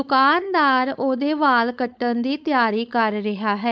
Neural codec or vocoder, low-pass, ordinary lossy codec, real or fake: codec, 16 kHz, 4.8 kbps, FACodec; none; none; fake